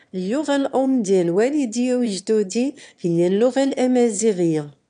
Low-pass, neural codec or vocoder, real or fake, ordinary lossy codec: 9.9 kHz; autoencoder, 22.05 kHz, a latent of 192 numbers a frame, VITS, trained on one speaker; fake; none